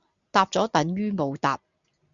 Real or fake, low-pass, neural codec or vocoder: real; 7.2 kHz; none